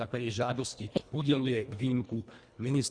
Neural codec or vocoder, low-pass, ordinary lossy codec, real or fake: codec, 24 kHz, 1.5 kbps, HILCodec; 9.9 kHz; Opus, 64 kbps; fake